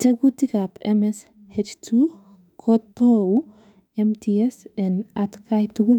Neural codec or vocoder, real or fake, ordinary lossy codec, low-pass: autoencoder, 48 kHz, 32 numbers a frame, DAC-VAE, trained on Japanese speech; fake; none; 19.8 kHz